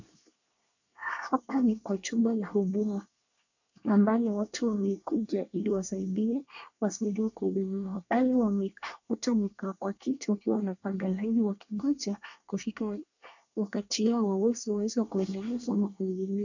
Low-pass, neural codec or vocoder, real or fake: 7.2 kHz; codec, 24 kHz, 1 kbps, SNAC; fake